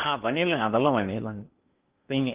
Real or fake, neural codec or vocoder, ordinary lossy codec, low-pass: fake; codec, 16 kHz in and 24 kHz out, 0.8 kbps, FocalCodec, streaming, 65536 codes; Opus, 32 kbps; 3.6 kHz